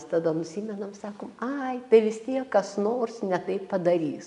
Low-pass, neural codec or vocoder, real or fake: 10.8 kHz; none; real